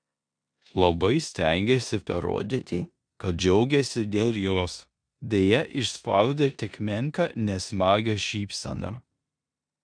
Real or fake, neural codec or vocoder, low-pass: fake; codec, 16 kHz in and 24 kHz out, 0.9 kbps, LongCat-Audio-Codec, four codebook decoder; 9.9 kHz